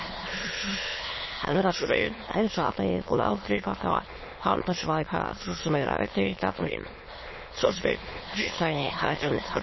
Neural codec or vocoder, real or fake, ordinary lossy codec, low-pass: autoencoder, 22.05 kHz, a latent of 192 numbers a frame, VITS, trained on many speakers; fake; MP3, 24 kbps; 7.2 kHz